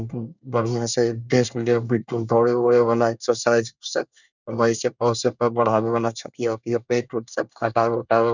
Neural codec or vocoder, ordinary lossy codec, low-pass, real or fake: codec, 24 kHz, 1 kbps, SNAC; none; 7.2 kHz; fake